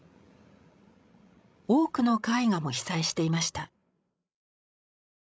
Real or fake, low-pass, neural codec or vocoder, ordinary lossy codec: fake; none; codec, 16 kHz, 16 kbps, FreqCodec, larger model; none